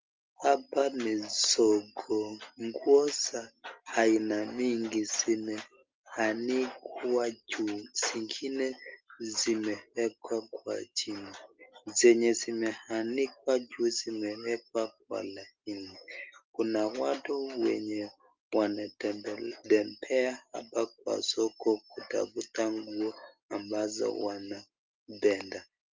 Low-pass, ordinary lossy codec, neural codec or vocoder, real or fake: 7.2 kHz; Opus, 32 kbps; none; real